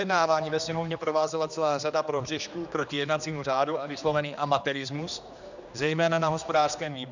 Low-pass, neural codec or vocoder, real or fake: 7.2 kHz; codec, 16 kHz, 2 kbps, X-Codec, HuBERT features, trained on general audio; fake